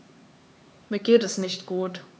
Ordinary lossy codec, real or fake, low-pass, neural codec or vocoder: none; fake; none; codec, 16 kHz, 4 kbps, X-Codec, HuBERT features, trained on LibriSpeech